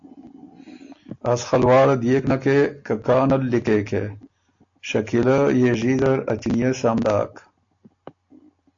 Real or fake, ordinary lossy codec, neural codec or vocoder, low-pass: real; AAC, 48 kbps; none; 7.2 kHz